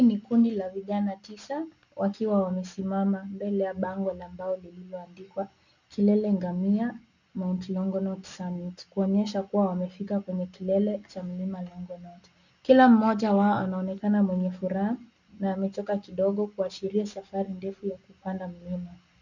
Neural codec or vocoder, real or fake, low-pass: none; real; 7.2 kHz